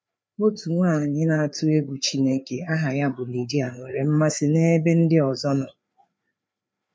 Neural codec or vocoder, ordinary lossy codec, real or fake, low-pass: codec, 16 kHz, 4 kbps, FreqCodec, larger model; none; fake; none